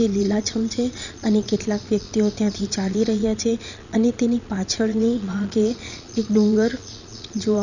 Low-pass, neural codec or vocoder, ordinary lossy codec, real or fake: 7.2 kHz; vocoder, 22.05 kHz, 80 mel bands, Vocos; none; fake